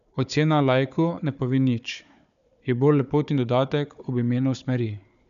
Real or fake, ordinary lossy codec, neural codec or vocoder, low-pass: fake; none; codec, 16 kHz, 4 kbps, FunCodec, trained on Chinese and English, 50 frames a second; 7.2 kHz